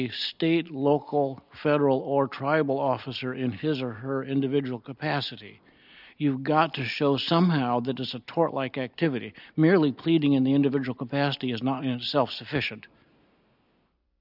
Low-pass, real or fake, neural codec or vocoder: 5.4 kHz; real; none